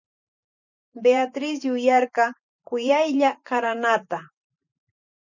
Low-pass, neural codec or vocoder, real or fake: 7.2 kHz; vocoder, 44.1 kHz, 128 mel bands every 512 samples, BigVGAN v2; fake